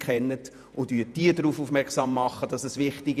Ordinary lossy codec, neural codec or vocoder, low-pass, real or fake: none; vocoder, 48 kHz, 128 mel bands, Vocos; 14.4 kHz; fake